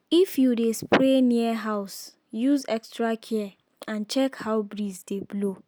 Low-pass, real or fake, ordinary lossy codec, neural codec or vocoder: none; real; none; none